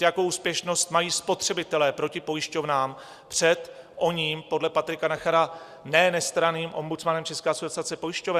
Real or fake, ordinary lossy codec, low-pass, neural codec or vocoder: real; Opus, 64 kbps; 14.4 kHz; none